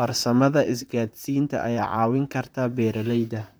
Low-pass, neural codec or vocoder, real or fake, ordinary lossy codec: none; vocoder, 44.1 kHz, 128 mel bands, Pupu-Vocoder; fake; none